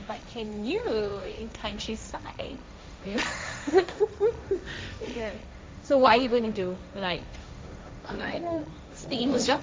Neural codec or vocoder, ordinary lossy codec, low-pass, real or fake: codec, 16 kHz, 1.1 kbps, Voila-Tokenizer; none; none; fake